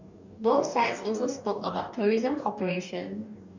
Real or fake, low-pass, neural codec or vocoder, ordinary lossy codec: fake; 7.2 kHz; codec, 44.1 kHz, 2.6 kbps, DAC; none